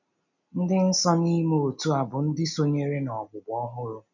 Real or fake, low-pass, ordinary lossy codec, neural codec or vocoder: real; 7.2 kHz; none; none